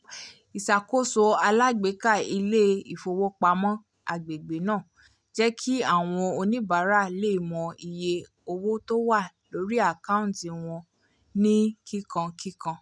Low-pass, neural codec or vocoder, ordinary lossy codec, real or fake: 9.9 kHz; none; none; real